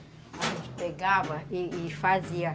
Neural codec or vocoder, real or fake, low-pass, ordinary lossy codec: none; real; none; none